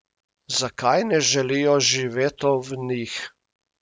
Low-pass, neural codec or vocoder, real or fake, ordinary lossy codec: none; none; real; none